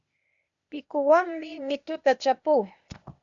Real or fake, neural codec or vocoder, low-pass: fake; codec, 16 kHz, 0.8 kbps, ZipCodec; 7.2 kHz